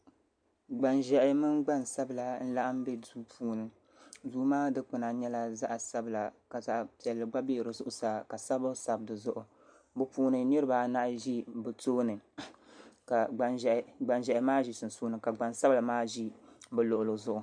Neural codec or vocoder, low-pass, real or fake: none; 9.9 kHz; real